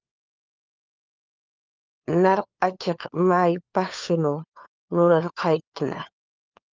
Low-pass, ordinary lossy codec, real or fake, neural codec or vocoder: 7.2 kHz; Opus, 24 kbps; fake; codec, 16 kHz, 4 kbps, FunCodec, trained on LibriTTS, 50 frames a second